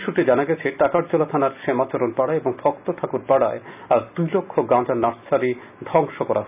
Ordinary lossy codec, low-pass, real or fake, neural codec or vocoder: none; 3.6 kHz; real; none